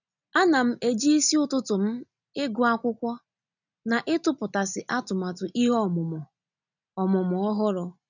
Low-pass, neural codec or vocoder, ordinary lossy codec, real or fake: 7.2 kHz; none; none; real